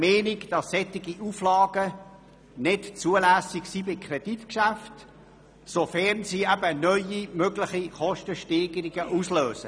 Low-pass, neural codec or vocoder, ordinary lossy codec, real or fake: none; none; none; real